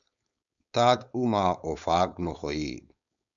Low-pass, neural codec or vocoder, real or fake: 7.2 kHz; codec, 16 kHz, 4.8 kbps, FACodec; fake